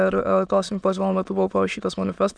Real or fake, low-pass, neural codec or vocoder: fake; 9.9 kHz; autoencoder, 22.05 kHz, a latent of 192 numbers a frame, VITS, trained on many speakers